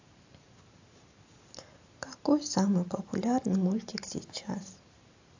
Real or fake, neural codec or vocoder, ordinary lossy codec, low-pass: fake; vocoder, 44.1 kHz, 128 mel bands every 512 samples, BigVGAN v2; AAC, 48 kbps; 7.2 kHz